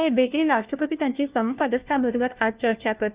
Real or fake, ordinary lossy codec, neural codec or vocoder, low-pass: fake; Opus, 24 kbps; codec, 16 kHz, 1 kbps, FunCodec, trained on LibriTTS, 50 frames a second; 3.6 kHz